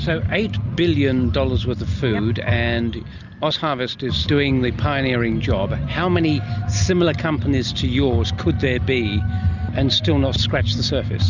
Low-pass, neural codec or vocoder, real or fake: 7.2 kHz; none; real